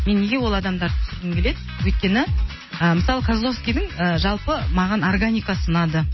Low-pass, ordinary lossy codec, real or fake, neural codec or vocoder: 7.2 kHz; MP3, 24 kbps; real; none